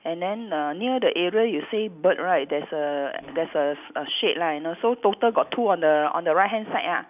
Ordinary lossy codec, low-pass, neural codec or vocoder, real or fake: none; 3.6 kHz; none; real